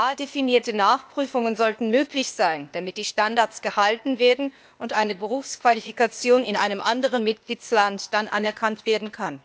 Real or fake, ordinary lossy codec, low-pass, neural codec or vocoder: fake; none; none; codec, 16 kHz, 0.8 kbps, ZipCodec